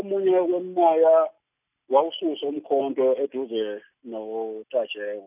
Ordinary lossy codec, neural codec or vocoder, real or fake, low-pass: none; none; real; 3.6 kHz